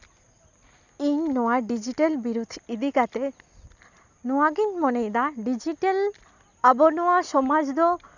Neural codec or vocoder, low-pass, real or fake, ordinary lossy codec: none; 7.2 kHz; real; none